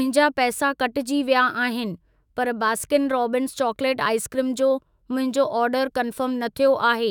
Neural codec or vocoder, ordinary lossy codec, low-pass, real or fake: autoencoder, 48 kHz, 128 numbers a frame, DAC-VAE, trained on Japanese speech; none; 19.8 kHz; fake